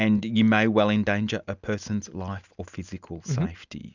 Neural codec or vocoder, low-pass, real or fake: none; 7.2 kHz; real